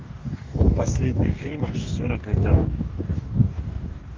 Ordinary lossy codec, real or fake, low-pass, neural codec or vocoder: Opus, 32 kbps; fake; 7.2 kHz; codec, 44.1 kHz, 2.6 kbps, DAC